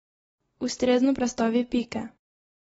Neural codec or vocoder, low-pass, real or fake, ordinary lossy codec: none; 19.8 kHz; real; AAC, 24 kbps